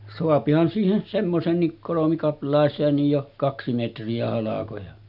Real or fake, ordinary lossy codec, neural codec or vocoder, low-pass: real; none; none; 5.4 kHz